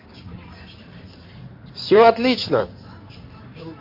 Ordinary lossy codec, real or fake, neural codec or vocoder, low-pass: MP3, 32 kbps; fake; codec, 16 kHz in and 24 kHz out, 2.2 kbps, FireRedTTS-2 codec; 5.4 kHz